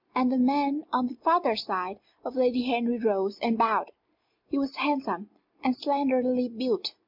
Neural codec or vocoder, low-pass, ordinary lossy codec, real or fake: none; 5.4 kHz; MP3, 48 kbps; real